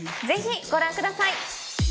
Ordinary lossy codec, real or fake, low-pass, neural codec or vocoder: none; real; none; none